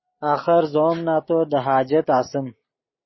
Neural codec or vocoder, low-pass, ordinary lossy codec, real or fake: none; 7.2 kHz; MP3, 24 kbps; real